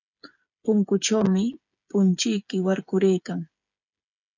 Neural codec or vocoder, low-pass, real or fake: codec, 16 kHz, 8 kbps, FreqCodec, smaller model; 7.2 kHz; fake